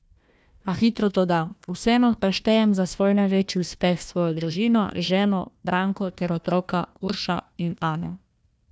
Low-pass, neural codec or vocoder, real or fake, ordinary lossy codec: none; codec, 16 kHz, 1 kbps, FunCodec, trained on Chinese and English, 50 frames a second; fake; none